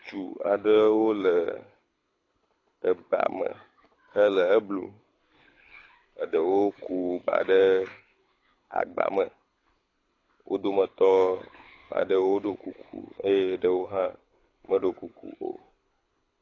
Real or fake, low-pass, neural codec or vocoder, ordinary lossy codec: fake; 7.2 kHz; codec, 24 kHz, 6 kbps, HILCodec; AAC, 32 kbps